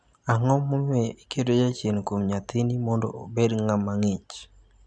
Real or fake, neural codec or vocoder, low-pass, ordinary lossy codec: real; none; 9.9 kHz; none